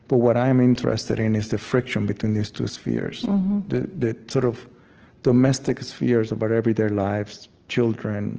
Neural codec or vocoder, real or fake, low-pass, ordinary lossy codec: none; real; 7.2 kHz; Opus, 16 kbps